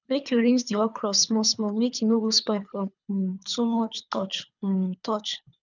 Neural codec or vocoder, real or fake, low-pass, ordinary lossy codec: codec, 24 kHz, 3 kbps, HILCodec; fake; 7.2 kHz; none